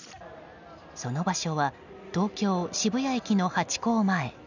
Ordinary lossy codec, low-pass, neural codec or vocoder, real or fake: none; 7.2 kHz; none; real